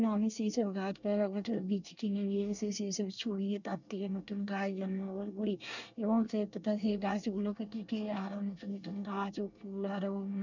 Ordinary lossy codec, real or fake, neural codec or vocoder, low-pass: none; fake; codec, 24 kHz, 1 kbps, SNAC; 7.2 kHz